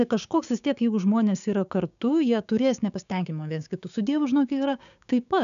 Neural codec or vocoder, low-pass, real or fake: codec, 16 kHz, 6 kbps, DAC; 7.2 kHz; fake